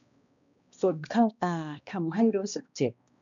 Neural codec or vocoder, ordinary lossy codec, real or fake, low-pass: codec, 16 kHz, 1 kbps, X-Codec, HuBERT features, trained on balanced general audio; none; fake; 7.2 kHz